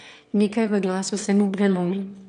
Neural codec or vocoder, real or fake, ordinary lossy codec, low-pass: autoencoder, 22.05 kHz, a latent of 192 numbers a frame, VITS, trained on one speaker; fake; none; 9.9 kHz